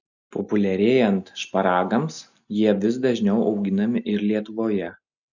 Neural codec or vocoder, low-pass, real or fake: none; 7.2 kHz; real